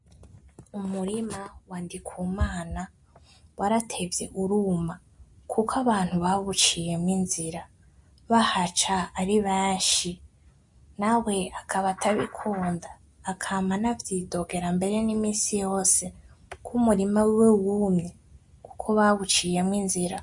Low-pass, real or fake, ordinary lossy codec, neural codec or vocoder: 10.8 kHz; real; MP3, 48 kbps; none